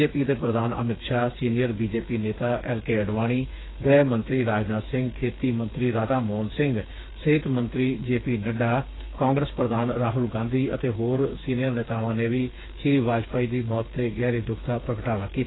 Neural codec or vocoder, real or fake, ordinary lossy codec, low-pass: codec, 16 kHz, 4 kbps, FreqCodec, smaller model; fake; AAC, 16 kbps; 7.2 kHz